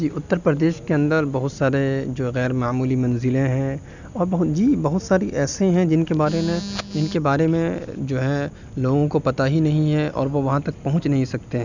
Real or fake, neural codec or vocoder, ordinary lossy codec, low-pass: real; none; none; 7.2 kHz